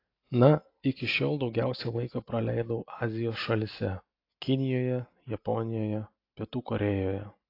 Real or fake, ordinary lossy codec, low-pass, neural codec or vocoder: real; AAC, 32 kbps; 5.4 kHz; none